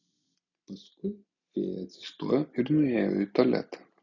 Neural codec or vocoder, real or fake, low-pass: none; real; 7.2 kHz